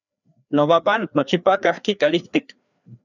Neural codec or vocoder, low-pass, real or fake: codec, 16 kHz, 2 kbps, FreqCodec, larger model; 7.2 kHz; fake